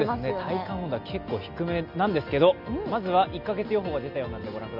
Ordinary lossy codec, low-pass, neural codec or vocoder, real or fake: none; 5.4 kHz; none; real